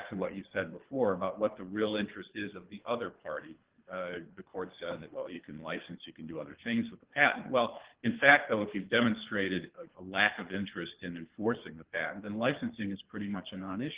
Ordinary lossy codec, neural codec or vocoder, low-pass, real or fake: Opus, 16 kbps; codec, 24 kHz, 3 kbps, HILCodec; 3.6 kHz; fake